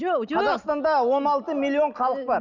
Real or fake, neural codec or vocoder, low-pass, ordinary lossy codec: real; none; 7.2 kHz; none